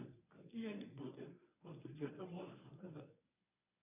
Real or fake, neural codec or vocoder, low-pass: fake; codec, 24 kHz, 0.9 kbps, WavTokenizer, medium speech release version 1; 3.6 kHz